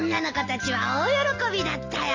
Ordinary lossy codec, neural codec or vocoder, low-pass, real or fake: AAC, 48 kbps; none; 7.2 kHz; real